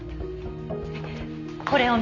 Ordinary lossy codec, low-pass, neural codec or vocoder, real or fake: none; 7.2 kHz; none; real